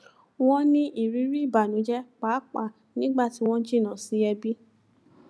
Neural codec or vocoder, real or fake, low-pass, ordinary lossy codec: none; real; none; none